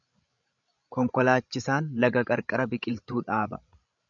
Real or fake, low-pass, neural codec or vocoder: fake; 7.2 kHz; codec, 16 kHz, 16 kbps, FreqCodec, larger model